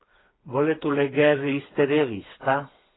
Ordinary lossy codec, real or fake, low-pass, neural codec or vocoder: AAC, 16 kbps; fake; 7.2 kHz; codec, 16 kHz, 4 kbps, FreqCodec, smaller model